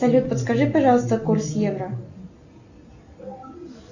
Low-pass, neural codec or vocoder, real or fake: 7.2 kHz; none; real